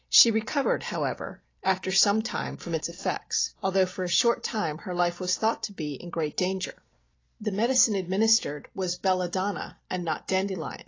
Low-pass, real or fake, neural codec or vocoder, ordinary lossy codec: 7.2 kHz; real; none; AAC, 32 kbps